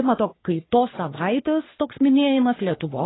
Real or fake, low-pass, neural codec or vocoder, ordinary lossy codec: fake; 7.2 kHz; codec, 44.1 kHz, 3.4 kbps, Pupu-Codec; AAC, 16 kbps